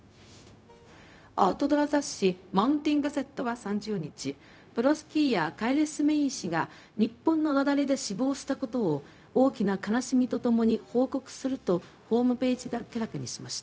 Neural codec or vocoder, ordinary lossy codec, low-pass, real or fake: codec, 16 kHz, 0.4 kbps, LongCat-Audio-Codec; none; none; fake